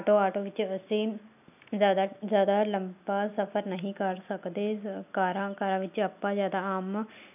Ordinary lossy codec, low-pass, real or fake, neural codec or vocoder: none; 3.6 kHz; real; none